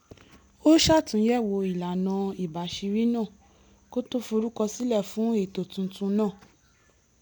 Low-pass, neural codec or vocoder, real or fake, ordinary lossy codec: none; none; real; none